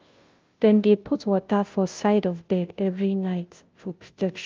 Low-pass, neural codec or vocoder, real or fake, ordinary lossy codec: 7.2 kHz; codec, 16 kHz, 0.5 kbps, FunCodec, trained on Chinese and English, 25 frames a second; fake; Opus, 24 kbps